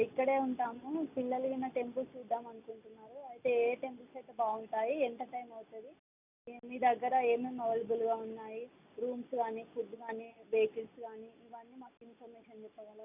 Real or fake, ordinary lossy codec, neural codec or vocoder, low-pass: real; none; none; 3.6 kHz